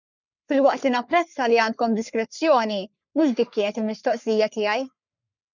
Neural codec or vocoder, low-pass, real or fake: codec, 44.1 kHz, 3.4 kbps, Pupu-Codec; 7.2 kHz; fake